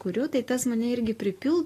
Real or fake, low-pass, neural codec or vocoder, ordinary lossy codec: real; 14.4 kHz; none; AAC, 48 kbps